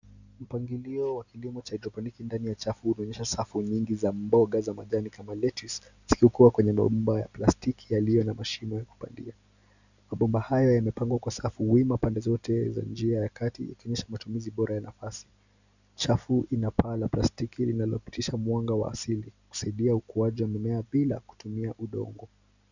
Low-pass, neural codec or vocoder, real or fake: 7.2 kHz; none; real